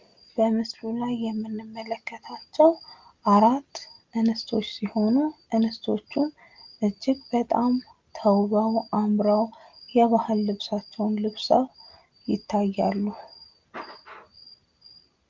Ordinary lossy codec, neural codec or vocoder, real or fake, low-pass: Opus, 32 kbps; none; real; 7.2 kHz